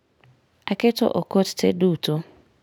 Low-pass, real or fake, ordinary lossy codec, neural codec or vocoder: none; real; none; none